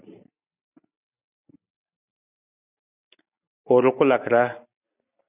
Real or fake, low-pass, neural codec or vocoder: real; 3.6 kHz; none